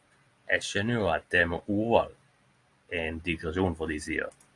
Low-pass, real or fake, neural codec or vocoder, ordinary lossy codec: 10.8 kHz; real; none; AAC, 48 kbps